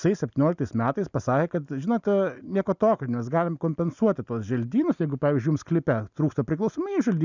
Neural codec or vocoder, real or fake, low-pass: none; real; 7.2 kHz